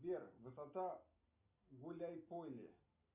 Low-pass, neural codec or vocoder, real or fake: 3.6 kHz; none; real